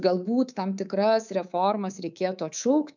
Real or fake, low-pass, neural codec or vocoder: fake; 7.2 kHz; codec, 24 kHz, 3.1 kbps, DualCodec